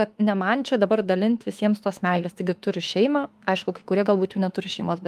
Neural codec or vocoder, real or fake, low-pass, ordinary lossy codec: autoencoder, 48 kHz, 32 numbers a frame, DAC-VAE, trained on Japanese speech; fake; 14.4 kHz; Opus, 32 kbps